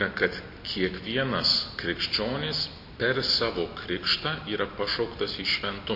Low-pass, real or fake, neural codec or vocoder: 5.4 kHz; real; none